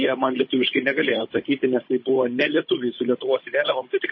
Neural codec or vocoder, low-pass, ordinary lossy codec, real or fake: codec, 16 kHz, 16 kbps, FunCodec, trained on Chinese and English, 50 frames a second; 7.2 kHz; MP3, 24 kbps; fake